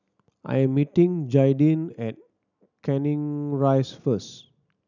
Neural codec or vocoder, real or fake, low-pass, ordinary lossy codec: none; real; 7.2 kHz; none